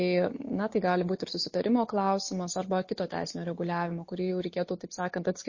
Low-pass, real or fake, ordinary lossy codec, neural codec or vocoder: 7.2 kHz; real; MP3, 32 kbps; none